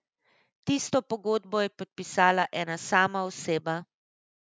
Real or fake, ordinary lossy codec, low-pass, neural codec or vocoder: real; none; none; none